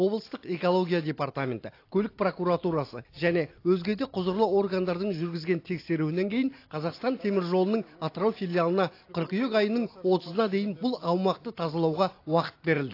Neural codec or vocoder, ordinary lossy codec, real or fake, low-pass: none; AAC, 32 kbps; real; 5.4 kHz